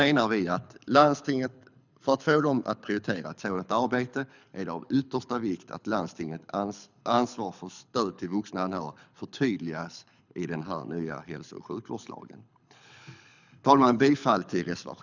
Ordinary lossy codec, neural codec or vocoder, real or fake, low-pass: none; codec, 24 kHz, 6 kbps, HILCodec; fake; 7.2 kHz